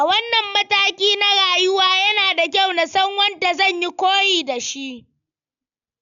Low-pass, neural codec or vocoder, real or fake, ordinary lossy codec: 7.2 kHz; none; real; none